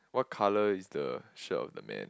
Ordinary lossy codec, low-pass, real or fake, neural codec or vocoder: none; none; real; none